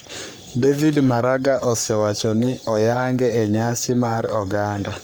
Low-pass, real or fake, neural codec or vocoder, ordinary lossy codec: none; fake; codec, 44.1 kHz, 3.4 kbps, Pupu-Codec; none